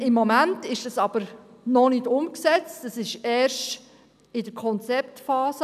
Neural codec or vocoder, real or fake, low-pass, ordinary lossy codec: none; real; 14.4 kHz; none